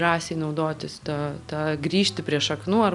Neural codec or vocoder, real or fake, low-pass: none; real; 10.8 kHz